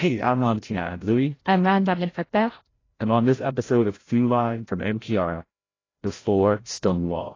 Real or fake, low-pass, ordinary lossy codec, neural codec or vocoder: fake; 7.2 kHz; AAC, 32 kbps; codec, 16 kHz, 0.5 kbps, FreqCodec, larger model